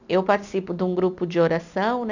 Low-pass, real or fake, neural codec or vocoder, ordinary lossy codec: 7.2 kHz; real; none; none